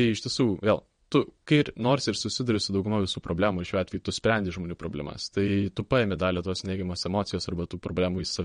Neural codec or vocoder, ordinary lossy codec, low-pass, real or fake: vocoder, 22.05 kHz, 80 mel bands, WaveNeXt; MP3, 48 kbps; 9.9 kHz; fake